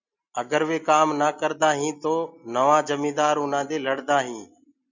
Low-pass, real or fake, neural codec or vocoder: 7.2 kHz; real; none